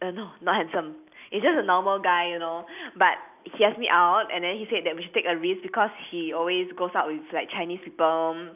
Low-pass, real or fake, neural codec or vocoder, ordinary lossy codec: 3.6 kHz; real; none; none